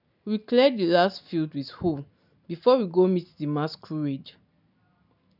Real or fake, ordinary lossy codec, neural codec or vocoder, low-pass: real; none; none; 5.4 kHz